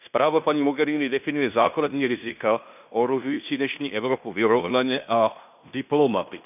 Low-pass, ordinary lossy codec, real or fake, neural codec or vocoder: 3.6 kHz; none; fake; codec, 16 kHz in and 24 kHz out, 0.9 kbps, LongCat-Audio-Codec, fine tuned four codebook decoder